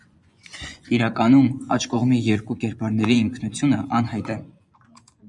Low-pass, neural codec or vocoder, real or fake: 10.8 kHz; vocoder, 24 kHz, 100 mel bands, Vocos; fake